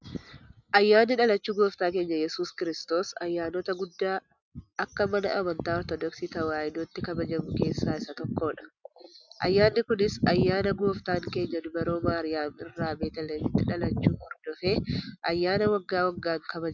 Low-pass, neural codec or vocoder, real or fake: 7.2 kHz; none; real